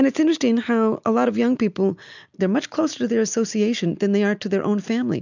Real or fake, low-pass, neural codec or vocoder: real; 7.2 kHz; none